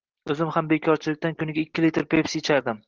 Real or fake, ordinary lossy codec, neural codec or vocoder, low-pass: real; Opus, 16 kbps; none; 7.2 kHz